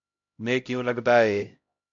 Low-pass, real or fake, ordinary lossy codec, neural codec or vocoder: 7.2 kHz; fake; AAC, 64 kbps; codec, 16 kHz, 0.5 kbps, X-Codec, HuBERT features, trained on LibriSpeech